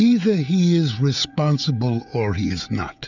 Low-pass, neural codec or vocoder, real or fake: 7.2 kHz; codec, 16 kHz, 16 kbps, FreqCodec, smaller model; fake